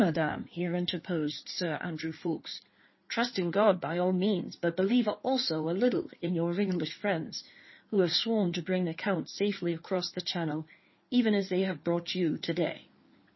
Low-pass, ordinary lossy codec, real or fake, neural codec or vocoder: 7.2 kHz; MP3, 24 kbps; fake; codec, 16 kHz in and 24 kHz out, 2.2 kbps, FireRedTTS-2 codec